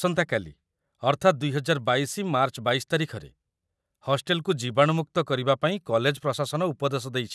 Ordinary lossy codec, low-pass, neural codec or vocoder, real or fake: none; none; none; real